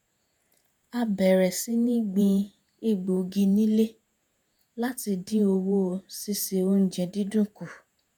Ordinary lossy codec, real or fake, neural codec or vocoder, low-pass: none; fake; vocoder, 48 kHz, 128 mel bands, Vocos; none